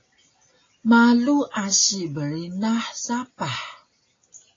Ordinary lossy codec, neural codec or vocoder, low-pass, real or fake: AAC, 32 kbps; none; 7.2 kHz; real